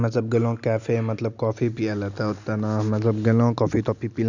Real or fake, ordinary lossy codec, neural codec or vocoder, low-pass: real; none; none; 7.2 kHz